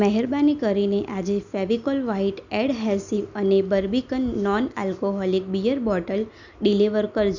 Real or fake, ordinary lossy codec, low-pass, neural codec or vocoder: real; none; 7.2 kHz; none